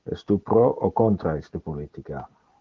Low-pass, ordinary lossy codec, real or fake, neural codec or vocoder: 7.2 kHz; Opus, 16 kbps; real; none